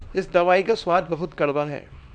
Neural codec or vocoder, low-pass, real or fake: codec, 24 kHz, 0.9 kbps, WavTokenizer, small release; 9.9 kHz; fake